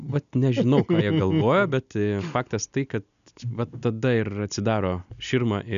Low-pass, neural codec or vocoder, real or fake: 7.2 kHz; none; real